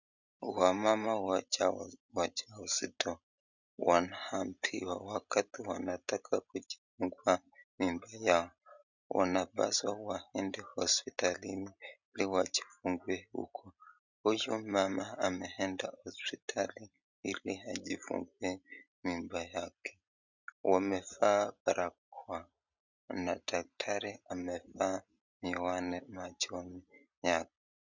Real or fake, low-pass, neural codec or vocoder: real; 7.2 kHz; none